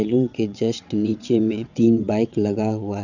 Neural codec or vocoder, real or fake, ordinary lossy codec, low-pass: vocoder, 22.05 kHz, 80 mel bands, WaveNeXt; fake; none; 7.2 kHz